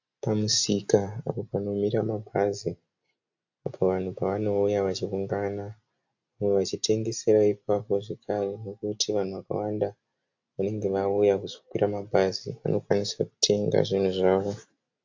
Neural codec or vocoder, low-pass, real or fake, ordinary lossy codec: none; 7.2 kHz; real; AAC, 48 kbps